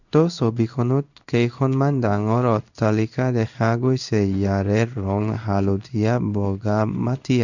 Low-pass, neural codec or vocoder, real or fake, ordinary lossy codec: 7.2 kHz; codec, 16 kHz in and 24 kHz out, 1 kbps, XY-Tokenizer; fake; none